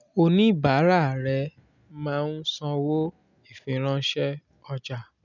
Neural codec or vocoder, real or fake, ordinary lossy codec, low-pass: none; real; none; 7.2 kHz